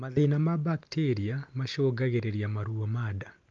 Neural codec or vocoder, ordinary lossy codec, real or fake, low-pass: none; Opus, 32 kbps; real; 7.2 kHz